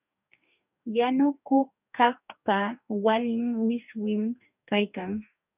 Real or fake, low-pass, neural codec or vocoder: fake; 3.6 kHz; codec, 44.1 kHz, 2.6 kbps, DAC